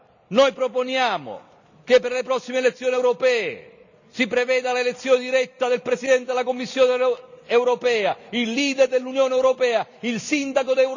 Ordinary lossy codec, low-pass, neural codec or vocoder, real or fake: none; 7.2 kHz; none; real